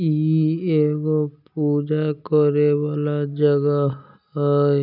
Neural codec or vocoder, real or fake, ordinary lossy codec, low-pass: none; real; none; 5.4 kHz